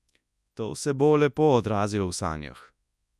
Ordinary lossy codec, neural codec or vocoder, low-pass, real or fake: none; codec, 24 kHz, 0.9 kbps, WavTokenizer, large speech release; none; fake